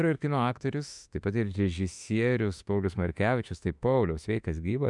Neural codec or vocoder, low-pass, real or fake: autoencoder, 48 kHz, 32 numbers a frame, DAC-VAE, trained on Japanese speech; 10.8 kHz; fake